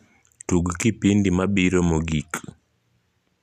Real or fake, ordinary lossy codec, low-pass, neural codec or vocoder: real; none; 14.4 kHz; none